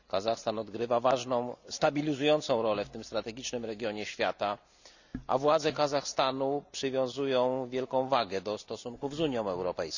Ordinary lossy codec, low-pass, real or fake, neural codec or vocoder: none; 7.2 kHz; real; none